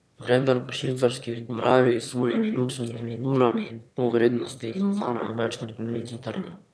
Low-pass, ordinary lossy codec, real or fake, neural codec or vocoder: none; none; fake; autoencoder, 22.05 kHz, a latent of 192 numbers a frame, VITS, trained on one speaker